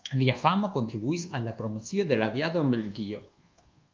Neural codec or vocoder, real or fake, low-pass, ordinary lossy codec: codec, 24 kHz, 1.2 kbps, DualCodec; fake; 7.2 kHz; Opus, 32 kbps